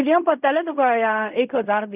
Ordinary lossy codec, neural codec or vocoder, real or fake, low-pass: none; codec, 16 kHz in and 24 kHz out, 0.4 kbps, LongCat-Audio-Codec, fine tuned four codebook decoder; fake; 3.6 kHz